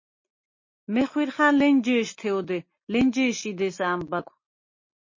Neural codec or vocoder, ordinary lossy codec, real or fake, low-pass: none; MP3, 32 kbps; real; 7.2 kHz